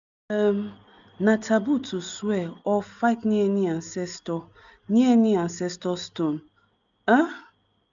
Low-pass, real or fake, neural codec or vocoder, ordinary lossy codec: 7.2 kHz; real; none; none